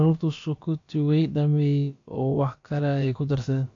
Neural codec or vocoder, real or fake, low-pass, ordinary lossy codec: codec, 16 kHz, about 1 kbps, DyCAST, with the encoder's durations; fake; 7.2 kHz; AAC, 48 kbps